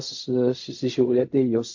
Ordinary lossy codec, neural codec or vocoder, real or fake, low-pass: AAC, 48 kbps; codec, 16 kHz in and 24 kHz out, 0.4 kbps, LongCat-Audio-Codec, fine tuned four codebook decoder; fake; 7.2 kHz